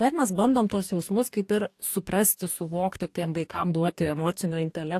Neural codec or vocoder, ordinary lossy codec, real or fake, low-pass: codec, 44.1 kHz, 2.6 kbps, DAC; AAC, 64 kbps; fake; 14.4 kHz